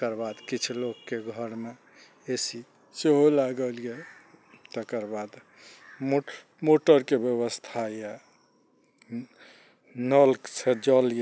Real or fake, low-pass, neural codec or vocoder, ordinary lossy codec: real; none; none; none